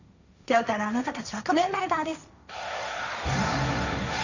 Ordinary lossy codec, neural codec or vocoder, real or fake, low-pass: none; codec, 16 kHz, 1.1 kbps, Voila-Tokenizer; fake; 7.2 kHz